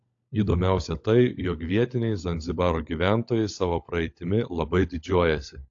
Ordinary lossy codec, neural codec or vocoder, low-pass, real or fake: AAC, 48 kbps; codec, 16 kHz, 16 kbps, FunCodec, trained on LibriTTS, 50 frames a second; 7.2 kHz; fake